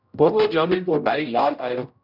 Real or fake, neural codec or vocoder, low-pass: fake; codec, 16 kHz, 0.5 kbps, X-Codec, HuBERT features, trained on general audio; 5.4 kHz